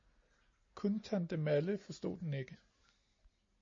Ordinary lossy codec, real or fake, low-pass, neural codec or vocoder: MP3, 32 kbps; real; 7.2 kHz; none